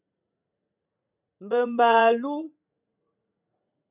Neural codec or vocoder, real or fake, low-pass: vocoder, 44.1 kHz, 80 mel bands, Vocos; fake; 3.6 kHz